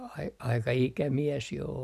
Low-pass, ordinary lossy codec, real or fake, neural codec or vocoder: 14.4 kHz; none; real; none